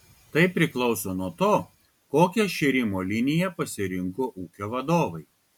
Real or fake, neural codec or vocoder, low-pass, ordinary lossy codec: real; none; 19.8 kHz; MP3, 96 kbps